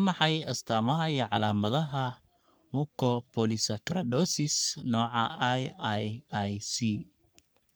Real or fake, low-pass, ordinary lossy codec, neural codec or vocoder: fake; none; none; codec, 44.1 kHz, 3.4 kbps, Pupu-Codec